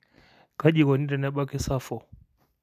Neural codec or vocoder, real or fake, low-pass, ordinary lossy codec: none; real; 14.4 kHz; none